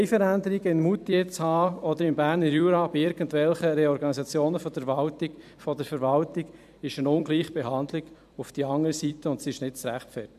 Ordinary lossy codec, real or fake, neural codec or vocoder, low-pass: none; fake; vocoder, 44.1 kHz, 128 mel bands every 256 samples, BigVGAN v2; 14.4 kHz